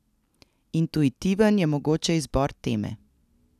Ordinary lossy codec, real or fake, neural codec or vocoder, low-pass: none; real; none; 14.4 kHz